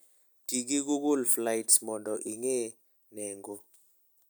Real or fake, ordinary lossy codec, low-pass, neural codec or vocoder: real; none; none; none